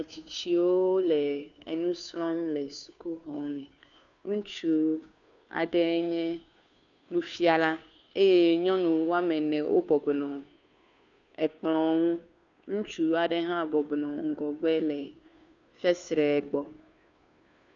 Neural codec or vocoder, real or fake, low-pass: codec, 16 kHz, 2 kbps, FunCodec, trained on Chinese and English, 25 frames a second; fake; 7.2 kHz